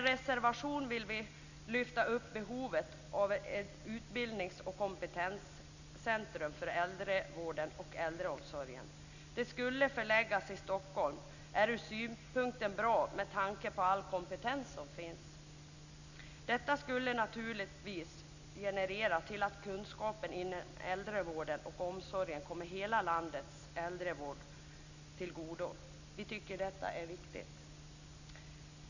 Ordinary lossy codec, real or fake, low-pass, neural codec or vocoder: none; real; 7.2 kHz; none